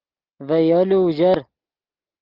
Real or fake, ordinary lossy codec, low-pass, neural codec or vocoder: real; Opus, 24 kbps; 5.4 kHz; none